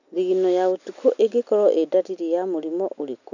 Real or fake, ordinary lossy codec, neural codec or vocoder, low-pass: real; none; none; 7.2 kHz